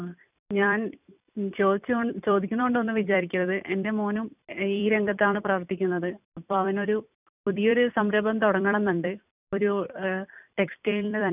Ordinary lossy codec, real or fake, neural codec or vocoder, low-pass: none; fake; vocoder, 44.1 kHz, 128 mel bands every 256 samples, BigVGAN v2; 3.6 kHz